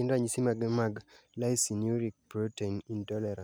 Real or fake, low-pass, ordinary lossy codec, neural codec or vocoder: real; none; none; none